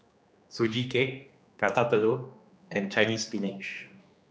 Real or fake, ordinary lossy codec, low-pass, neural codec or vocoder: fake; none; none; codec, 16 kHz, 2 kbps, X-Codec, HuBERT features, trained on general audio